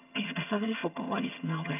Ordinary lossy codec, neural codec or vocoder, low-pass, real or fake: none; vocoder, 22.05 kHz, 80 mel bands, HiFi-GAN; 3.6 kHz; fake